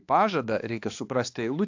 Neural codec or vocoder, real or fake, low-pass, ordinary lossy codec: codec, 16 kHz, 2 kbps, X-Codec, HuBERT features, trained on balanced general audio; fake; 7.2 kHz; AAC, 48 kbps